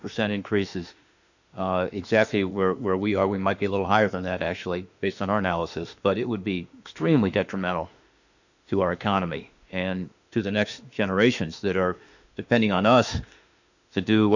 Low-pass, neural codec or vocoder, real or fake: 7.2 kHz; autoencoder, 48 kHz, 32 numbers a frame, DAC-VAE, trained on Japanese speech; fake